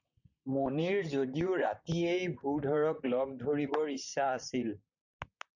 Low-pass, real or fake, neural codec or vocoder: 7.2 kHz; fake; vocoder, 44.1 kHz, 128 mel bands, Pupu-Vocoder